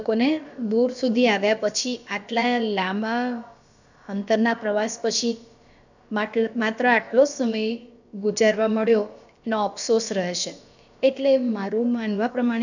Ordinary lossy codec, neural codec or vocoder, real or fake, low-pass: none; codec, 16 kHz, about 1 kbps, DyCAST, with the encoder's durations; fake; 7.2 kHz